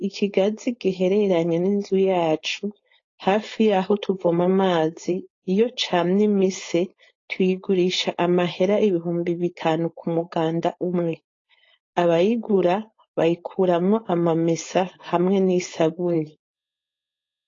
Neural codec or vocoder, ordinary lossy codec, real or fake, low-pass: codec, 16 kHz, 4.8 kbps, FACodec; AAC, 32 kbps; fake; 7.2 kHz